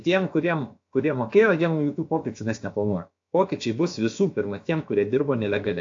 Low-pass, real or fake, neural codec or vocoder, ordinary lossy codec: 7.2 kHz; fake; codec, 16 kHz, about 1 kbps, DyCAST, with the encoder's durations; AAC, 48 kbps